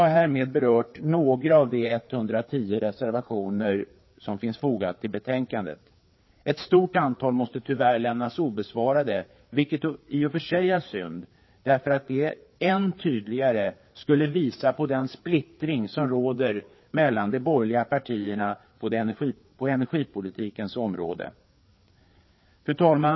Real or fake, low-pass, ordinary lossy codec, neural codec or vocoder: fake; 7.2 kHz; MP3, 24 kbps; codec, 16 kHz, 4 kbps, FreqCodec, larger model